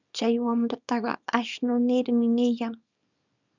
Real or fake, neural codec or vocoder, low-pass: fake; codec, 24 kHz, 0.9 kbps, WavTokenizer, small release; 7.2 kHz